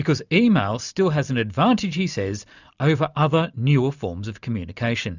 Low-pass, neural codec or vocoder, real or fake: 7.2 kHz; none; real